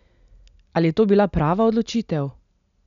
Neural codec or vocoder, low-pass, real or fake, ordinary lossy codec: none; 7.2 kHz; real; none